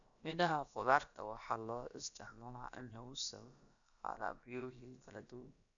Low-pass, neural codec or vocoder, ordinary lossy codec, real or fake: 7.2 kHz; codec, 16 kHz, about 1 kbps, DyCAST, with the encoder's durations; none; fake